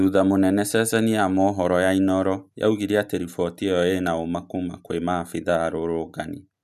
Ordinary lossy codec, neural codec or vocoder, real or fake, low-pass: none; none; real; 14.4 kHz